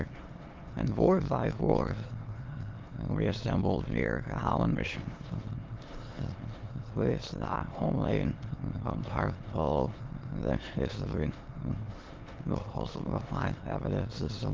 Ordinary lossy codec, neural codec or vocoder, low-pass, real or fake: Opus, 16 kbps; autoencoder, 22.05 kHz, a latent of 192 numbers a frame, VITS, trained on many speakers; 7.2 kHz; fake